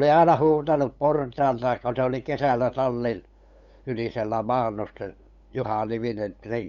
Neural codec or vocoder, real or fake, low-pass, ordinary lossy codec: codec, 16 kHz, 8 kbps, FunCodec, trained on LibriTTS, 25 frames a second; fake; 7.2 kHz; Opus, 64 kbps